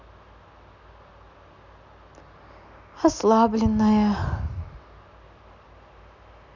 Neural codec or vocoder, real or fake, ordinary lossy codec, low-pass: none; real; none; 7.2 kHz